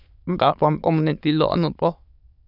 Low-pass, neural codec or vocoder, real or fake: 5.4 kHz; autoencoder, 22.05 kHz, a latent of 192 numbers a frame, VITS, trained on many speakers; fake